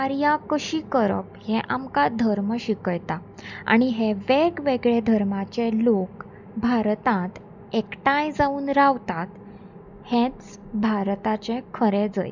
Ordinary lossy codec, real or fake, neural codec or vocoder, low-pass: none; real; none; 7.2 kHz